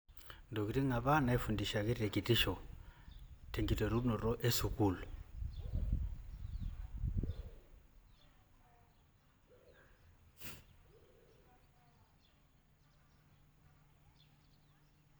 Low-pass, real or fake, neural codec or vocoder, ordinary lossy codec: none; real; none; none